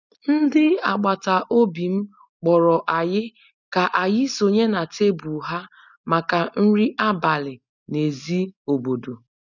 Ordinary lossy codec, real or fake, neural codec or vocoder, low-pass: none; real; none; 7.2 kHz